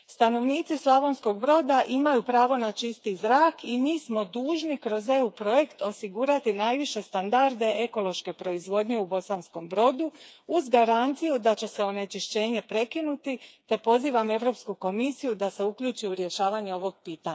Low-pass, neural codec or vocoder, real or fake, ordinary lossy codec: none; codec, 16 kHz, 4 kbps, FreqCodec, smaller model; fake; none